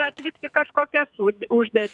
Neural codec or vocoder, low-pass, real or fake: codec, 44.1 kHz, 2.6 kbps, SNAC; 10.8 kHz; fake